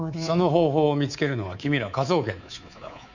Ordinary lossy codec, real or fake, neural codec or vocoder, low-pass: none; fake; codec, 24 kHz, 3.1 kbps, DualCodec; 7.2 kHz